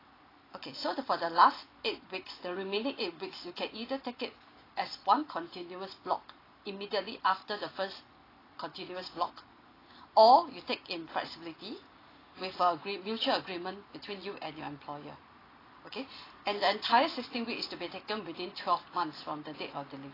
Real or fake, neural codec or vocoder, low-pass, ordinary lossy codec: real; none; 5.4 kHz; AAC, 24 kbps